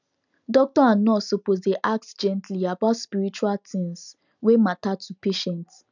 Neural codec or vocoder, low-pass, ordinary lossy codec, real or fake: none; 7.2 kHz; none; real